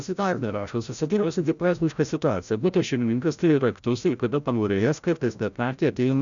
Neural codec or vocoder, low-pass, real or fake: codec, 16 kHz, 0.5 kbps, FreqCodec, larger model; 7.2 kHz; fake